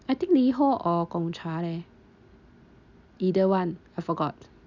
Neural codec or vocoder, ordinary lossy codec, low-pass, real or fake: none; none; 7.2 kHz; real